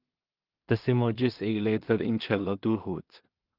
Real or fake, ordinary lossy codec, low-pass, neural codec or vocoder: fake; Opus, 32 kbps; 5.4 kHz; codec, 16 kHz in and 24 kHz out, 0.4 kbps, LongCat-Audio-Codec, two codebook decoder